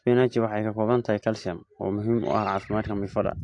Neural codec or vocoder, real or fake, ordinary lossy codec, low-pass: none; real; AAC, 48 kbps; 10.8 kHz